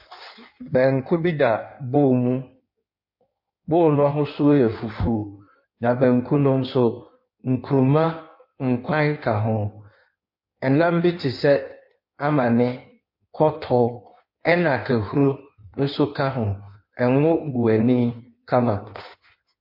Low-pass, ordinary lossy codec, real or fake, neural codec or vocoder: 5.4 kHz; MP3, 32 kbps; fake; codec, 16 kHz in and 24 kHz out, 1.1 kbps, FireRedTTS-2 codec